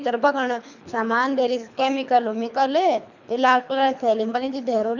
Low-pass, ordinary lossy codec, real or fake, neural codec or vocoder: 7.2 kHz; none; fake; codec, 24 kHz, 3 kbps, HILCodec